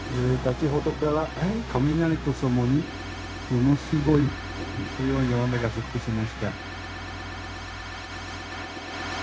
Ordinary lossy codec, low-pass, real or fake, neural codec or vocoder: none; none; fake; codec, 16 kHz, 0.4 kbps, LongCat-Audio-Codec